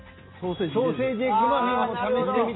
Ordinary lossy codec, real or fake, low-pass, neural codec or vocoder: AAC, 16 kbps; real; 7.2 kHz; none